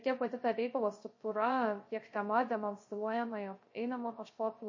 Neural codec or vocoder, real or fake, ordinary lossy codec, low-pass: codec, 16 kHz, 0.3 kbps, FocalCodec; fake; MP3, 32 kbps; 7.2 kHz